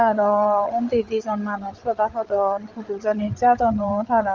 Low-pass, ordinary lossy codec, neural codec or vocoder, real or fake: 7.2 kHz; Opus, 32 kbps; codec, 16 kHz, 8 kbps, FreqCodec, larger model; fake